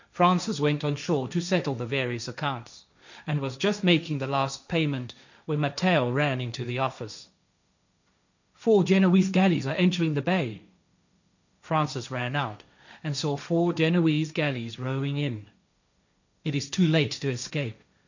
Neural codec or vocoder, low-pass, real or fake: codec, 16 kHz, 1.1 kbps, Voila-Tokenizer; 7.2 kHz; fake